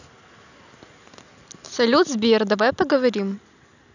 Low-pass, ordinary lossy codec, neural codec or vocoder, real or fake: 7.2 kHz; none; none; real